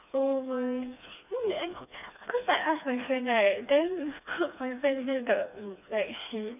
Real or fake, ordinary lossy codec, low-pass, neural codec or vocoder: fake; none; 3.6 kHz; codec, 16 kHz, 2 kbps, FreqCodec, smaller model